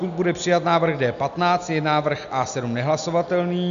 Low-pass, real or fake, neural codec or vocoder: 7.2 kHz; real; none